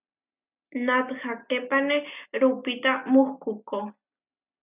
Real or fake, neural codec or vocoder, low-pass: real; none; 3.6 kHz